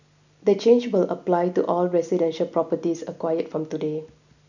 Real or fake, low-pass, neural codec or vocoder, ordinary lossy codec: real; 7.2 kHz; none; none